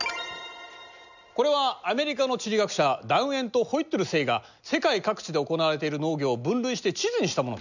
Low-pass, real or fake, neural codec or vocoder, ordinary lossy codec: 7.2 kHz; real; none; none